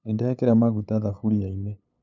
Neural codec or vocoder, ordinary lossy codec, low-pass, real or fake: codec, 16 kHz, 8 kbps, FunCodec, trained on LibriTTS, 25 frames a second; none; 7.2 kHz; fake